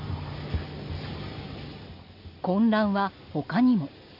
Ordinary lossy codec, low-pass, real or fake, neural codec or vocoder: none; 5.4 kHz; real; none